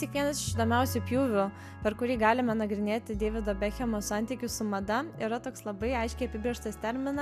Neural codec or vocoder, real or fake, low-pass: none; real; 14.4 kHz